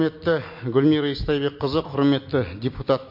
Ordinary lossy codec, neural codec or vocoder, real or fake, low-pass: MP3, 32 kbps; none; real; 5.4 kHz